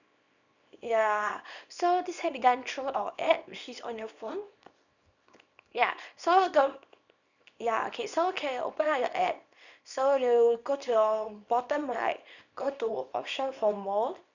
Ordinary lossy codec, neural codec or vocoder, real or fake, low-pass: none; codec, 24 kHz, 0.9 kbps, WavTokenizer, small release; fake; 7.2 kHz